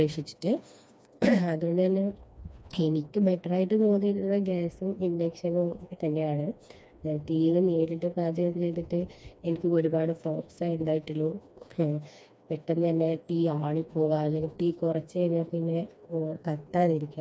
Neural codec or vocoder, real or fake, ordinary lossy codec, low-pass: codec, 16 kHz, 2 kbps, FreqCodec, smaller model; fake; none; none